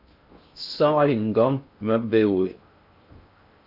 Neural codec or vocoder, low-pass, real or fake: codec, 16 kHz in and 24 kHz out, 0.6 kbps, FocalCodec, streaming, 2048 codes; 5.4 kHz; fake